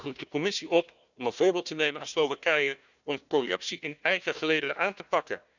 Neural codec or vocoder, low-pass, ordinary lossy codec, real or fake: codec, 16 kHz, 1 kbps, FunCodec, trained on Chinese and English, 50 frames a second; 7.2 kHz; none; fake